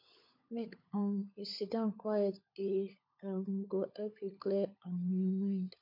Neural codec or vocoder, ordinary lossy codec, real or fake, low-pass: codec, 16 kHz, 16 kbps, FunCodec, trained on LibriTTS, 50 frames a second; MP3, 32 kbps; fake; 5.4 kHz